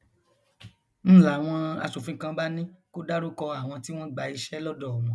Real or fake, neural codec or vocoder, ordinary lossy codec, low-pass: real; none; none; none